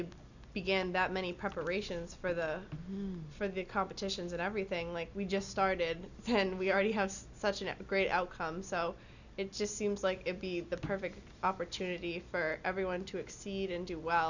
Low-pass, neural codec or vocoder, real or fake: 7.2 kHz; none; real